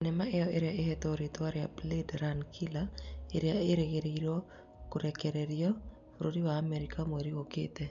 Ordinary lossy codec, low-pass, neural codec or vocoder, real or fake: none; 7.2 kHz; none; real